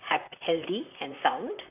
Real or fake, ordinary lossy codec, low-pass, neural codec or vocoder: fake; none; 3.6 kHz; vocoder, 44.1 kHz, 128 mel bands, Pupu-Vocoder